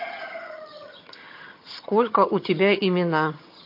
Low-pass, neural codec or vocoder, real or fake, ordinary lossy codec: 5.4 kHz; vocoder, 22.05 kHz, 80 mel bands, HiFi-GAN; fake; MP3, 32 kbps